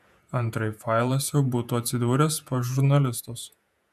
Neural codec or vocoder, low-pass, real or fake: vocoder, 48 kHz, 128 mel bands, Vocos; 14.4 kHz; fake